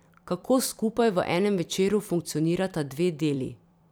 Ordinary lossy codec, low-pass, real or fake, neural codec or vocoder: none; none; fake; vocoder, 44.1 kHz, 128 mel bands every 256 samples, BigVGAN v2